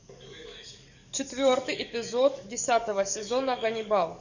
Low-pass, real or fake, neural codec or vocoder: 7.2 kHz; fake; codec, 44.1 kHz, 7.8 kbps, DAC